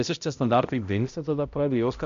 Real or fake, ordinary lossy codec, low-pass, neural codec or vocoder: fake; MP3, 64 kbps; 7.2 kHz; codec, 16 kHz, 0.5 kbps, X-Codec, HuBERT features, trained on balanced general audio